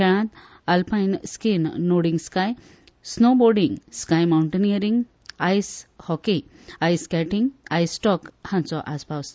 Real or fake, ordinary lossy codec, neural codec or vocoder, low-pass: real; none; none; none